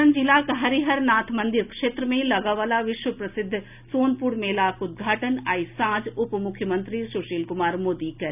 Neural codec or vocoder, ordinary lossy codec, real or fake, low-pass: none; none; real; 3.6 kHz